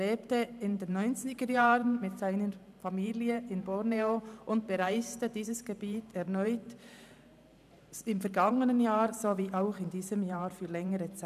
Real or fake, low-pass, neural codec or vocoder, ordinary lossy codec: fake; 14.4 kHz; vocoder, 44.1 kHz, 128 mel bands every 512 samples, BigVGAN v2; none